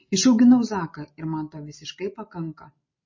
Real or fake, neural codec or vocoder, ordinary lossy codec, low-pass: real; none; MP3, 32 kbps; 7.2 kHz